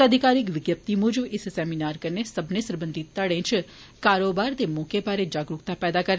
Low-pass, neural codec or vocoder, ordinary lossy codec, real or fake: none; none; none; real